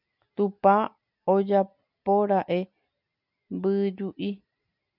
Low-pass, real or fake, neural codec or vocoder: 5.4 kHz; real; none